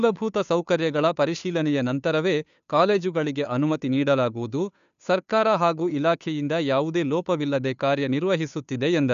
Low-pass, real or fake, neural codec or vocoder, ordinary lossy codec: 7.2 kHz; fake; codec, 16 kHz, 2 kbps, FunCodec, trained on Chinese and English, 25 frames a second; none